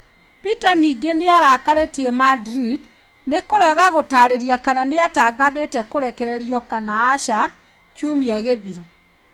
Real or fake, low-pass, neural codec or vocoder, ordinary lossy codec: fake; 19.8 kHz; codec, 44.1 kHz, 2.6 kbps, DAC; none